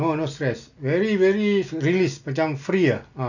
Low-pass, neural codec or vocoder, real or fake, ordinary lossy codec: 7.2 kHz; none; real; none